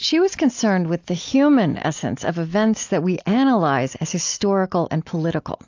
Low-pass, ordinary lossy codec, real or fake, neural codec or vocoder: 7.2 kHz; AAC, 48 kbps; real; none